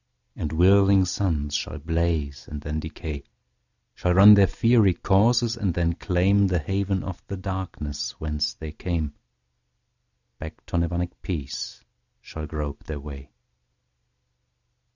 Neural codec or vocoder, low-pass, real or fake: none; 7.2 kHz; real